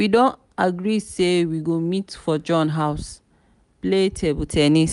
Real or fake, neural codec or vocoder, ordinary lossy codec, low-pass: real; none; none; 10.8 kHz